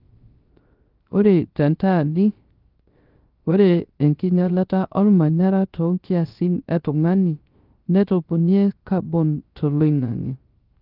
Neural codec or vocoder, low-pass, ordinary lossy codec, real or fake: codec, 16 kHz, 0.3 kbps, FocalCodec; 5.4 kHz; Opus, 32 kbps; fake